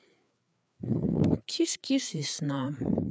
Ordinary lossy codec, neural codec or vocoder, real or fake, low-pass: none; codec, 16 kHz, 4 kbps, FreqCodec, larger model; fake; none